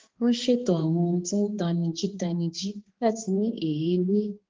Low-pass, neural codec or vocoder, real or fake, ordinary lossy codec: 7.2 kHz; codec, 16 kHz, 2 kbps, X-Codec, HuBERT features, trained on balanced general audio; fake; Opus, 16 kbps